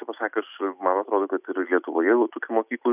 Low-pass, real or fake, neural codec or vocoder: 3.6 kHz; real; none